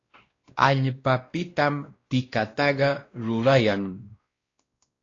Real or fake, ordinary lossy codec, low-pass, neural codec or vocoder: fake; AAC, 32 kbps; 7.2 kHz; codec, 16 kHz, 1 kbps, X-Codec, WavLM features, trained on Multilingual LibriSpeech